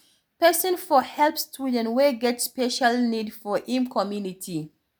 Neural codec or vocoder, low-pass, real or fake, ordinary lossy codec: none; none; real; none